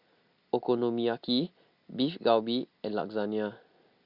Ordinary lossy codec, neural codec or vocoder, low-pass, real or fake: Opus, 64 kbps; none; 5.4 kHz; real